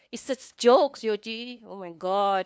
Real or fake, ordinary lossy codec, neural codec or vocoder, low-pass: fake; none; codec, 16 kHz, 2 kbps, FunCodec, trained on LibriTTS, 25 frames a second; none